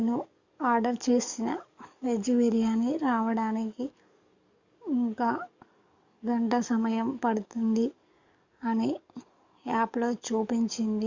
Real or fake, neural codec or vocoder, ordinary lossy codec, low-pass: fake; codec, 44.1 kHz, 7.8 kbps, DAC; Opus, 64 kbps; 7.2 kHz